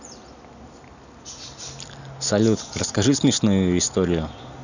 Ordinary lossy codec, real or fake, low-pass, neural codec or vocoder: none; real; 7.2 kHz; none